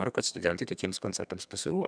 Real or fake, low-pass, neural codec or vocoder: fake; 9.9 kHz; codec, 32 kHz, 1.9 kbps, SNAC